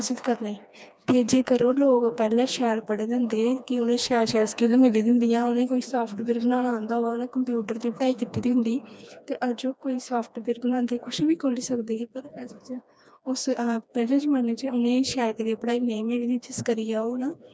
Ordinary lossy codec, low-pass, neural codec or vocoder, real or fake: none; none; codec, 16 kHz, 2 kbps, FreqCodec, smaller model; fake